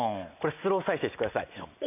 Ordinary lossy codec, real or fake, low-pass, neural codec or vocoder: none; real; 3.6 kHz; none